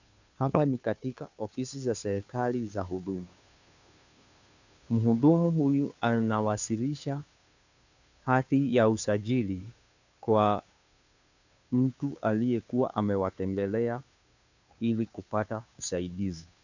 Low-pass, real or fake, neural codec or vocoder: 7.2 kHz; fake; codec, 16 kHz, 2 kbps, FunCodec, trained on Chinese and English, 25 frames a second